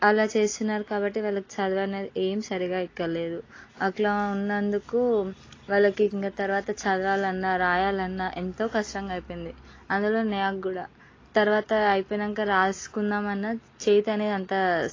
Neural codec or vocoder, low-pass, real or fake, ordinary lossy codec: none; 7.2 kHz; real; AAC, 32 kbps